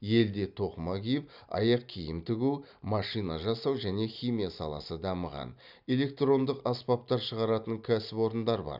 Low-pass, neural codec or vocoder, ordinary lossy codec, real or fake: 5.4 kHz; none; none; real